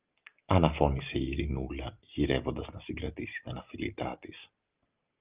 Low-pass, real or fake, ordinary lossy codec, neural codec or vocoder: 3.6 kHz; real; Opus, 24 kbps; none